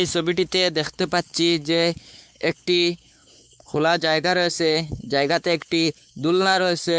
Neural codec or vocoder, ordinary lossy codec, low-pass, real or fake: codec, 16 kHz, 4 kbps, X-Codec, WavLM features, trained on Multilingual LibriSpeech; none; none; fake